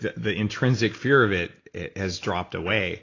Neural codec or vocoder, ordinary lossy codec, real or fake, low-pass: none; AAC, 32 kbps; real; 7.2 kHz